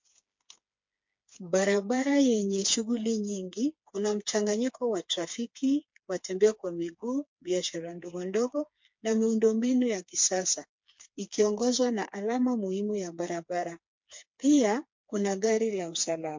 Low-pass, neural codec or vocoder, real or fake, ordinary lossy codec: 7.2 kHz; codec, 16 kHz, 4 kbps, FreqCodec, smaller model; fake; MP3, 48 kbps